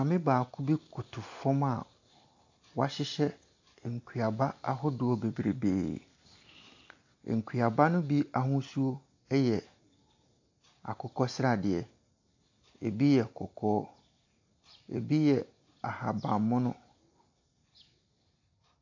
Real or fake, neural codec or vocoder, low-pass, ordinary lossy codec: real; none; 7.2 kHz; AAC, 48 kbps